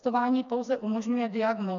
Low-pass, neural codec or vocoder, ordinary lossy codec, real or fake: 7.2 kHz; codec, 16 kHz, 2 kbps, FreqCodec, smaller model; MP3, 96 kbps; fake